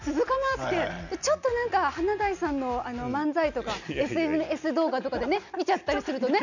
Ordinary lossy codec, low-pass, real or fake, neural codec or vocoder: none; 7.2 kHz; real; none